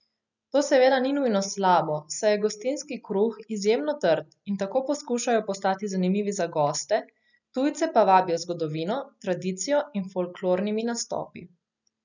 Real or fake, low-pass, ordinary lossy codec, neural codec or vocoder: real; 7.2 kHz; none; none